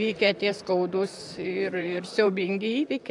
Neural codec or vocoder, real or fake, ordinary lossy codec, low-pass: vocoder, 44.1 kHz, 128 mel bands, Pupu-Vocoder; fake; AAC, 64 kbps; 10.8 kHz